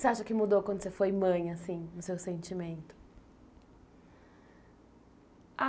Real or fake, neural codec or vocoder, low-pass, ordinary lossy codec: real; none; none; none